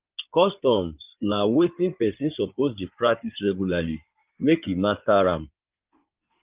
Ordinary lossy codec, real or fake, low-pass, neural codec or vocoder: Opus, 32 kbps; fake; 3.6 kHz; codec, 16 kHz in and 24 kHz out, 2.2 kbps, FireRedTTS-2 codec